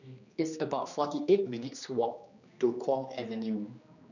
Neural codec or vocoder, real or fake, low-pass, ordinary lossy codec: codec, 16 kHz, 2 kbps, X-Codec, HuBERT features, trained on general audio; fake; 7.2 kHz; none